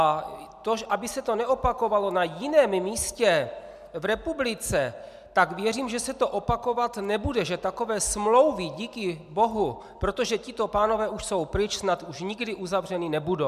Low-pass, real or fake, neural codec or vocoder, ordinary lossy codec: 14.4 kHz; real; none; MP3, 96 kbps